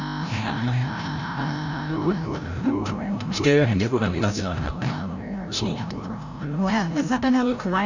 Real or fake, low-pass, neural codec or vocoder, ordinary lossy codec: fake; 7.2 kHz; codec, 16 kHz, 0.5 kbps, FreqCodec, larger model; none